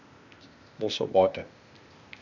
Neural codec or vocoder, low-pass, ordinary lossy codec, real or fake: codec, 16 kHz, 0.8 kbps, ZipCodec; 7.2 kHz; none; fake